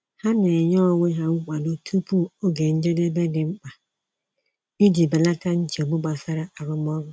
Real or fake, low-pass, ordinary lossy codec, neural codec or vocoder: real; none; none; none